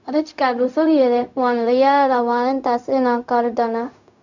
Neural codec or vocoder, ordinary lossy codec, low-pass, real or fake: codec, 16 kHz, 0.4 kbps, LongCat-Audio-Codec; none; 7.2 kHz; fake